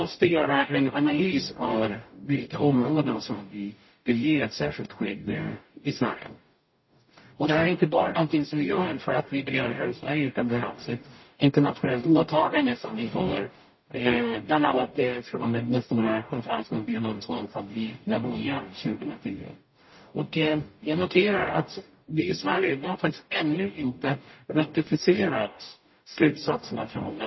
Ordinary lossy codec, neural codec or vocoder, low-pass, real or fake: MP3, 24 kbps; codec, 44.1 kHz, 0.9 kbps, DAC; 7.2 kHz; fake